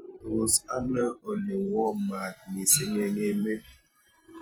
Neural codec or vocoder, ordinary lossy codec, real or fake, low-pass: vocoder, 44.1 kHz, 128 mel bands every 512 samples, BigVGAN v2; none; fake; none